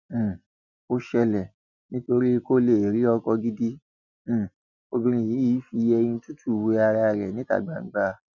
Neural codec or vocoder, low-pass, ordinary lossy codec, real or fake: none; 7.2 kHz; none; real